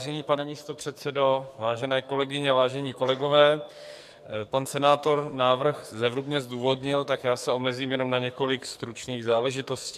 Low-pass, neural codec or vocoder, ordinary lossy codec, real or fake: 14.4 kHz; codec, 44.1 kHz, 2.6 kbps, SNAC; MP3, 96 kbps; fake